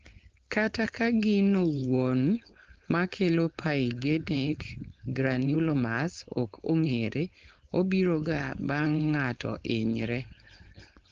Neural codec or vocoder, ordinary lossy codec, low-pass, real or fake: codec, 16 kHz, 4.8 kbps, FACodec; Opus, 16 kbps; 7.2 kHz; fake